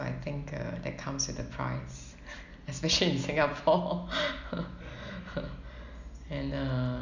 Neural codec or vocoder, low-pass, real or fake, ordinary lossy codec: none; 7.2 kHz; real; none